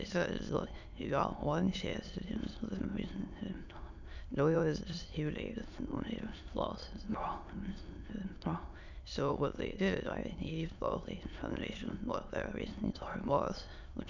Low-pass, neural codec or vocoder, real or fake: 7.2 kHz; autoencoder, 22.05 kHz, a latent of 192 numbers a frame, VITS, trained on many speakers; fake